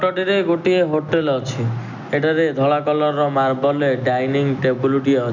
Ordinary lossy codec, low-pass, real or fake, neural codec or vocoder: none; 7.2 kHz; real; none